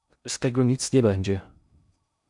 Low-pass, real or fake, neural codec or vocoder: 10.8 kHz; fake; codec, 16 kHz in and 24 kHz out, 0.6 kbps, FocalCodec, streaming, 2048 codes